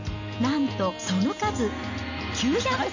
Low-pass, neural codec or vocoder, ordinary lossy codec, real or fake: 7.2 kHz; none; none; real